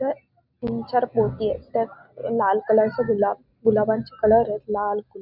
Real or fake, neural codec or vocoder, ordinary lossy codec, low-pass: real; none; none; 5.4 kHz